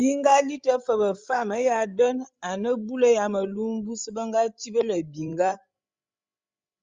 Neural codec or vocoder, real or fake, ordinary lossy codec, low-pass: codec, 16 kHz, 16 kbps, FreqCodec, larger model; fake; Opus, 32 kbps; 7.2 kHz